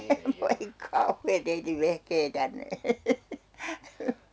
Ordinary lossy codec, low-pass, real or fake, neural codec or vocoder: none; none; real; none